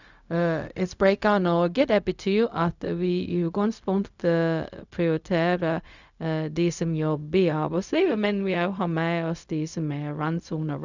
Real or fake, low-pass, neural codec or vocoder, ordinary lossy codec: fake; 7.2 kHz; codec, 16 kHz, 0.4 kbps, LongCat-Audio-Codec; none